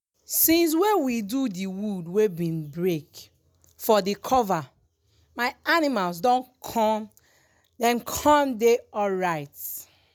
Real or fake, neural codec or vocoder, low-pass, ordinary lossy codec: real; none; none; none